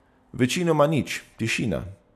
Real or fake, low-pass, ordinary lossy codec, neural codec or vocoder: real; 14.4 kHz; none; none